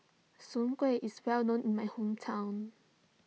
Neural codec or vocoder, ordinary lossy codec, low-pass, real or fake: none; none; none; real